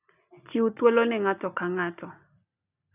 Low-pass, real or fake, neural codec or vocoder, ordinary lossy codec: 3.6 kHz; real; none; none